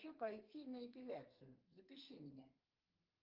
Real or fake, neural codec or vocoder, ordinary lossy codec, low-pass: fake; codec, 32 kHz, 1.9 kbps, SNAC; Opus, 32 kbps; 5.4 kHz